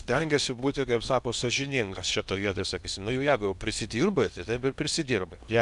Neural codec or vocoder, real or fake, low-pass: codec, 16 kHz in and 24 kHz out, 0.8 kbps, FocalCodec, streaming, 65536 codes; fake; 10.8 kHz